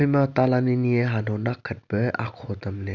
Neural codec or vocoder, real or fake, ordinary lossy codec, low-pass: none; real; none; 7.2 kHz